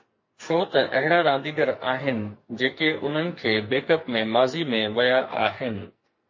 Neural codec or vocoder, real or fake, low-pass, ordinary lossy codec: codec, 44.1 kHz, 2.6 kbps, SNAC; fake; 7.2 kHz; MP3, 32 kbps